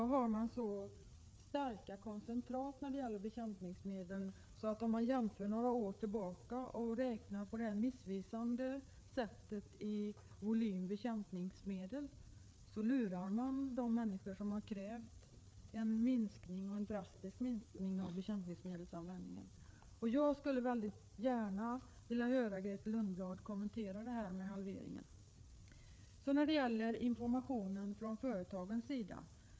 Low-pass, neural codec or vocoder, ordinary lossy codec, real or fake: none; codec, 16 kHz, 4 kbps, FreqCodec, larger model; none; fake